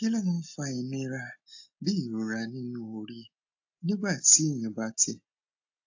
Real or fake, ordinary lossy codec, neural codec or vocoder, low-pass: real; AAC, 48 kbps; none; 7.2 kHz